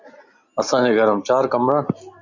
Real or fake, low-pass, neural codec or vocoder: real; 7.2 kHz; none